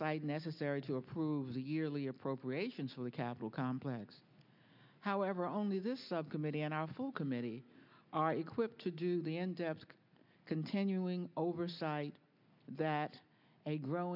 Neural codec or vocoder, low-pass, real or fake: none; 5.4 kHz; real